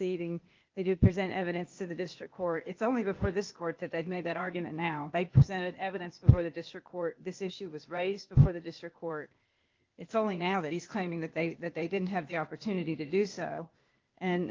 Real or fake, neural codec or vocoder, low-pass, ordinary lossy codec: fake; codec, 16 kHz, 0.8 kbps, ZipCodec; 7.2 kHz; Opus, 24 kbps